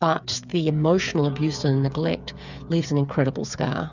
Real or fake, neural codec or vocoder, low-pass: fake; codec, 16 kHz, 8 kbps, FreqCodec, smaller model; 7.2 kHz